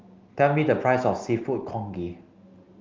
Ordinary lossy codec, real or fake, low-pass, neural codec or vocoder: Opus, 32 kbps; real; 7.2 kHz; none